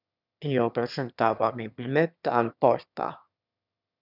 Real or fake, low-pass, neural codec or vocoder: fake; 5.4 kHz; autoencoder, 22.05 kHz, a latent of 192 numbers a frame, VITS, trained on one speaker